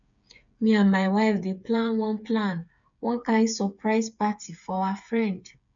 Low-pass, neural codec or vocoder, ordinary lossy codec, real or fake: 7.2 kHz; codec, 16 kHz, 8 kbps, FreqCodec, smaller model; none; fake